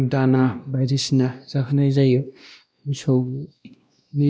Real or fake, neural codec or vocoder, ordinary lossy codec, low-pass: fake; codec, 16 kHz, 1 kbps, X-Codec, WavLM features, trained on Multilingual LibriSpeech; none; none